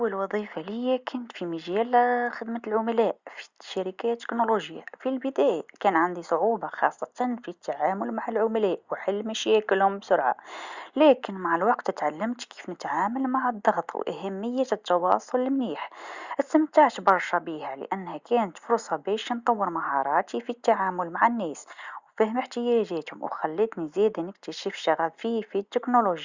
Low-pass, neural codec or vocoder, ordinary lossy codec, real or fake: 7.2 kHz; none; Opus, 64 kbps; real